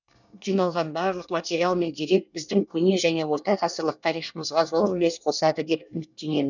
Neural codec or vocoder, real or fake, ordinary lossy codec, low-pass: codec, 24 kHz, 1 kbps, SNAC; fake; none; 7.2 kHz